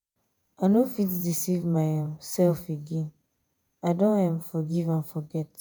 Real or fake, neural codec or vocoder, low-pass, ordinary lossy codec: fake; vocoder, 48 kHz, 128 mel bands, Vocos; none; none